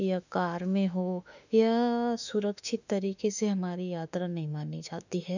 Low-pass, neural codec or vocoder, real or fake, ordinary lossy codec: 7.2 kHz; autoencoder, 48 kHz, 32 numbers a frame, DAC-VAE, trained on Japanese speech; fake; none